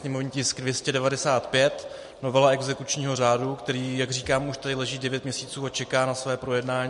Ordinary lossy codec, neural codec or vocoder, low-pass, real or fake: MP3, 48 kbps; none; 14.4 kHz; real